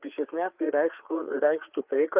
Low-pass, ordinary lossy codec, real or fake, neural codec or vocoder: 3.6 kHz; Opus, 24 kbps; fake; codec, 16 kHz, 4 kbps, FreqCodec, larger model